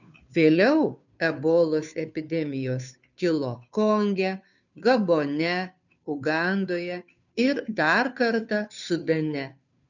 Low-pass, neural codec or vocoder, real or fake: 7.2 kHz; codec, 16 kHz, 2 kbps, FunCodec, trained on Chinese and English, 25 frames a second; fake